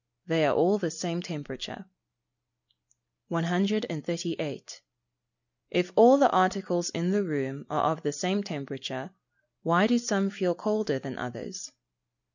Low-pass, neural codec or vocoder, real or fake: 7.2 kHz; none; real